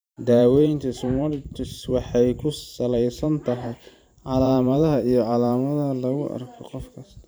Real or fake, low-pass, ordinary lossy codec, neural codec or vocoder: fake; none; none; vocoder, 44.1 kHz, 128 mel bands every 512 samples, BigVGAN v2